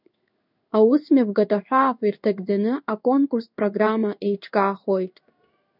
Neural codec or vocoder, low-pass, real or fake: codec, 16 kHz in and 24 kHz out, 1 kbps, XY-Tokenizer; 5.4 kHz; fake